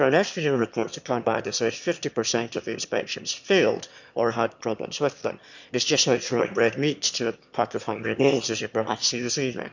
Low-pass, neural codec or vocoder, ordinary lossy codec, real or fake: 7.2 kHz; autoencoder, 22.05 kHz, a latent of 192 numbers a frame, VITS, trained on one speaker; none; fake